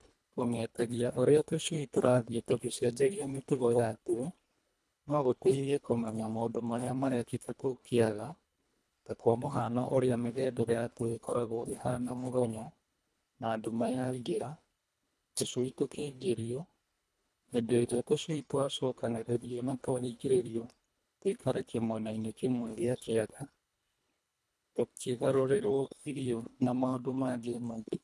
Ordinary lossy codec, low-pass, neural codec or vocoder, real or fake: none; none; codec, 24 kHz, 1.5 kbps, HILCodec; fake